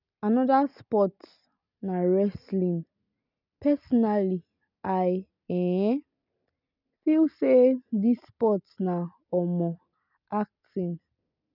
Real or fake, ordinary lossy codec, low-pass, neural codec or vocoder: real; none; 5.4 kHz; none